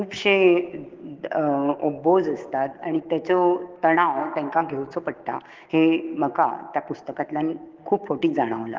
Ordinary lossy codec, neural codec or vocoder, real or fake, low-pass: Opus, 16 kbps; codec, 16 kHz, 6 kbps, DAC; fake; 7.2 kHz